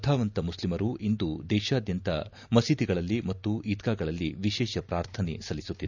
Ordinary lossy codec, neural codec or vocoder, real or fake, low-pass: none; none; real; 7.2 kHz